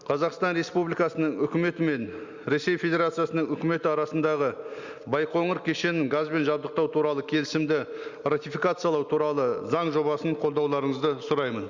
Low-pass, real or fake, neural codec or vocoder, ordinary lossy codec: 7.2 kHz; real; none; Opus, 64 kbps